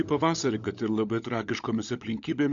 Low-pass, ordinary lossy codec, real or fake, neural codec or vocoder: 7.2 kHz; AAC, 64 kbps; fake; codec, 16 kHz, 16 kbps, FunCodec, trained on LibriTTS, 50 frames a second